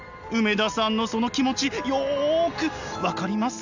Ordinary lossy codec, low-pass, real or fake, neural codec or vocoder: none; 7.2 kHz; real; none